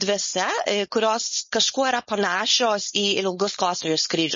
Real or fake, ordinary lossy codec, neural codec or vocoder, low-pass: fake; MP3, 32 kbps; codec, 16 kHz, 4.8 kbps, FACodec; 7.2 kHz